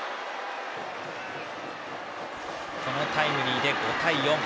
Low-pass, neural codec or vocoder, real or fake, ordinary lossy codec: none; none; real; none